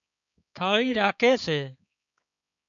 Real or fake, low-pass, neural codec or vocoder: fake; 7.2 kHz; codec, 16 kHz, 4 kbps, X-Codec, HuBERT features, trained on balanced general audio